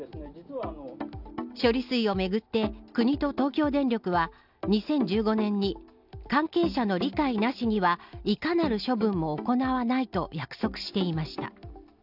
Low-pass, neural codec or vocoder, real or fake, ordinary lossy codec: 5.4 kHz; none; real; none